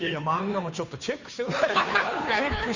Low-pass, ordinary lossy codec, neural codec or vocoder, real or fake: 7.2 kHz; none; codec, 16 kHz, 2 kbps, FunCodec, trained on Chinese and English, 25 frames a second; fake